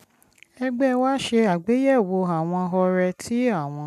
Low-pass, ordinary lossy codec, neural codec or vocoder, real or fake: 14.4 kHz; none; none; real